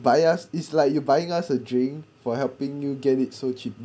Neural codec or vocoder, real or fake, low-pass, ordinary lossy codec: none; real; none; none